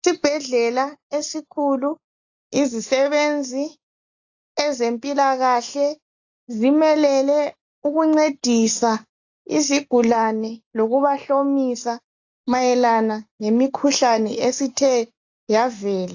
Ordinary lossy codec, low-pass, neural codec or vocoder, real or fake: AAC, 48 kbps; 7.2 kHz; none; real